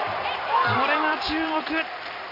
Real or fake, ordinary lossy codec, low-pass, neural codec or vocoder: real; none; 5.4 kHz; none